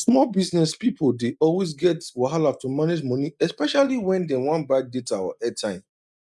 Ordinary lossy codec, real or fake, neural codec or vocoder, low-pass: none; real; none; none